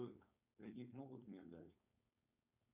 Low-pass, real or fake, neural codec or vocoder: 3.6 kHz; fake; codec, 16 kHz, 4.8 kbps, FACodec